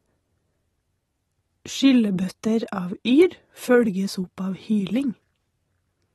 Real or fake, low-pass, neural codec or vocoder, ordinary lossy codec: real; 19.8 kHz; none; AAC, 32 kbps